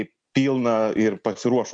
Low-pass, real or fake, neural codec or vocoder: 9.9 kHz; real; none